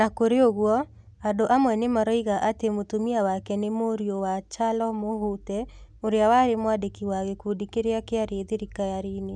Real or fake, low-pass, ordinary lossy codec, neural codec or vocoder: real; 9.9 kHz; none; none